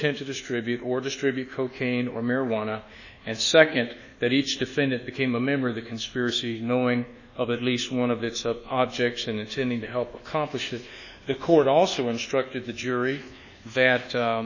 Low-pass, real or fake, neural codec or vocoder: 7.2 kHz; fake; codec, 24 kHz, 1.2 kbps, DualCodec